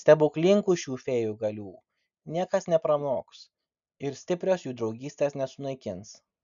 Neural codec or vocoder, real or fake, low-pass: none; real; 7.2 kHz